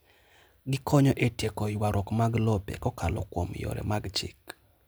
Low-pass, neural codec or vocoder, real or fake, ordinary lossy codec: none; none; real; none